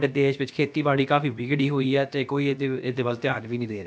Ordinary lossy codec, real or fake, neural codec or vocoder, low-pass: none; fake; codec, 16 kHz, about 1 kbps, DyCAST, with the encoder's durations; none